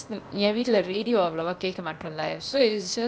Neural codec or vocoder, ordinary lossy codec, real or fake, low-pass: codec, 16 kHz, 0.8 kbps, ZipCodec; none; fake; none